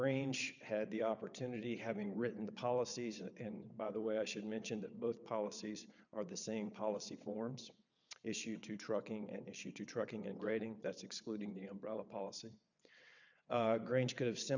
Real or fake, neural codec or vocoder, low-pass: fake; vocoder, 22.05 kHz, 80 mel bands, WaveNeXt; 7.2 kHz